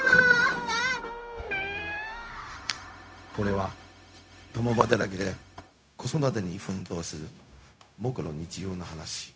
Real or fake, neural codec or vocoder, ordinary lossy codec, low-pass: fake; codec, 16 kHz, 0.4 kbps, LongCat-Audio-Codec; none; none